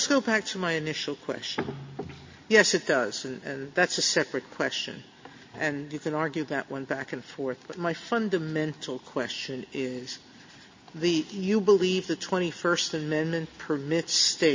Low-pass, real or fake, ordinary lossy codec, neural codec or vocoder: 7.2 kHz; real; MP3, 32 kbps; none